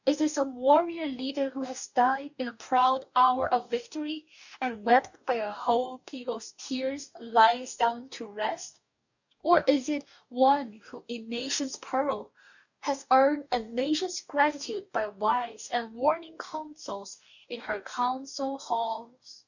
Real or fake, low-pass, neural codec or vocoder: fake; 7.2 kHz; codec, 44.1 kHz, 2.6 kbps, DAC